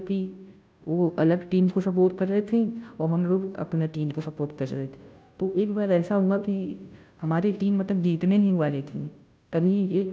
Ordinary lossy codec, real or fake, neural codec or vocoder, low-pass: none; fake; codec, 16 kHz, 0.5 kbps, FunCodec, trained on Chinese and English, 25 frames a second; none